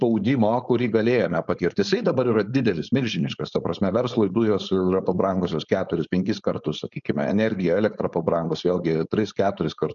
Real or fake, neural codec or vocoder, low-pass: fake; codec, 16 kHz, 4.8 kbps, FACodec; 7.2 kHz